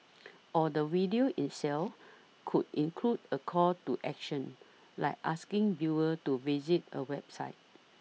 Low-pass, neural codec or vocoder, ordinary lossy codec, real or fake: none; none; none; real